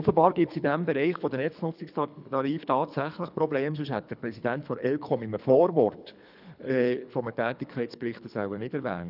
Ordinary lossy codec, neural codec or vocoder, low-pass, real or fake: none; codec, 24 kHz, 3 kbps, HILCodec; 5.4 kHz; fake